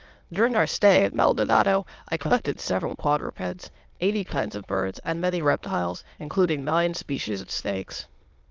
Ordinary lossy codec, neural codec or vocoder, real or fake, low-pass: Opus, 32 kbps; autoencoder, 22.05 kHz, a latent of 192 numbers a frame, VITS, trained on many speakers; fake; 7.2 kHz